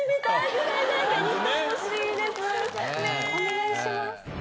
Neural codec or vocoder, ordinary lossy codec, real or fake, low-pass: none; none; real; none